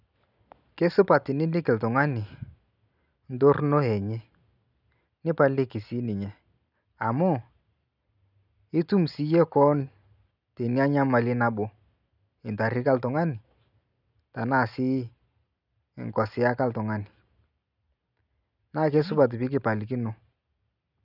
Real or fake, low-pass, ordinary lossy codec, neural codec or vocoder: real; 5.4 kHz; none; none